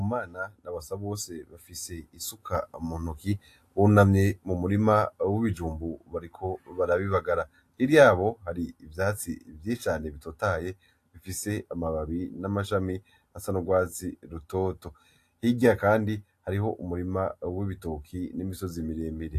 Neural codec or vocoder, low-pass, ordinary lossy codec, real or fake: none; 14.4 kHz; AAC, 64 kbps; real